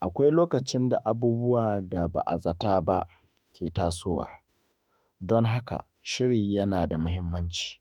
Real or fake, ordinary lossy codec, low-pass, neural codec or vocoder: fake; none; 19.8 kHz; autoencoder, 48 kHz, 32 numbers a frame, DAC-VAE, trained on Japanese speech